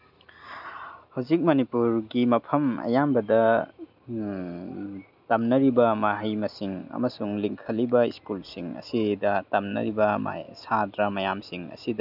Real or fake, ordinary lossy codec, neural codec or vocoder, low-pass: real; none; none; 5.4 kHz